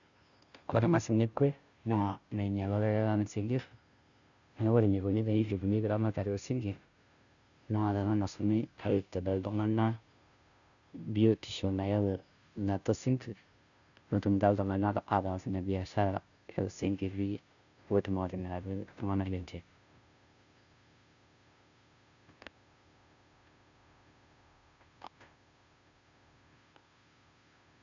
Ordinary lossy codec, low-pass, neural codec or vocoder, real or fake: none; 7.2 kHz; codec, 16 kHz, 0.5 kbps, FunCodec, trained on Chinese and English, 25 frames a second; fake